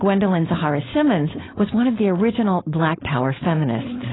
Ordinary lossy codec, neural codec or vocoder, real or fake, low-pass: AAC, 16 kbps; codec, 16 kHz, 4.8 kbps, FACodec; fake; 7.2 kHz